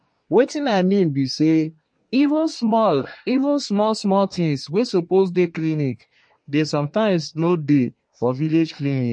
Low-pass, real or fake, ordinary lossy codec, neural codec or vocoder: 9.9 kHz; fake; MP3, 48 kbps; codec, 24 kHz, 1 kbps, SNAC